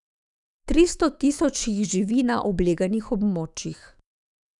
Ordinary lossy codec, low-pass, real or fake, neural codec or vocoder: none; 10.8 kHz; fake; autoencoder, 48 kHz, 128 numbers a frame, DAC-VAE, trained on Japanese speech